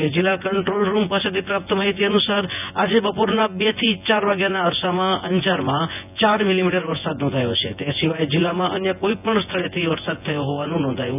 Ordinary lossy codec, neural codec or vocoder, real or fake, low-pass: none; vocoder, 24 kHz, 100 mel bands, Vocos; fake; 3.6 kHz